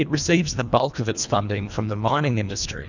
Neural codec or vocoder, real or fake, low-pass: codec, 24 kHz, 1.5 kbps, HILCodec; fake; 7.2 kHz